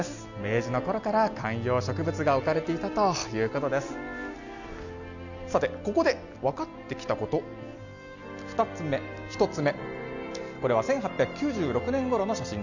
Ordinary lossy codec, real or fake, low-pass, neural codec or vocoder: none; real; 7.2 kHz; none